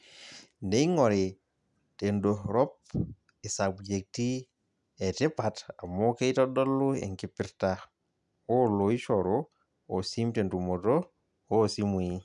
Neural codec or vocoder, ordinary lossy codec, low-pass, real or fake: none; none; 10.8 kHz; real